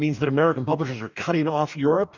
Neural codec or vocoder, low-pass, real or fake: codec, 44.1 kHz, 2.6 kbps, DAC; 7.2 kHz; fake